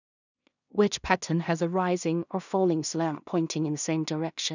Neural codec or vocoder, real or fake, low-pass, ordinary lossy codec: codec, 16 kHz in and 24 kHz out, 0.4 kbps, LongCat-Audio-Codec, two codebook decoder; fake; 7.2 kHz; none